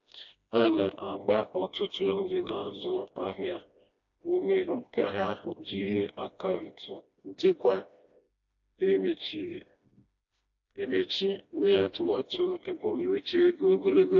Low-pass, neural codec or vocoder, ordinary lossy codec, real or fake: 7.2 kHz; codec, 16 kHz, 1 kbps, FreqCodec, smaller model; AAC, 48 kbps; fake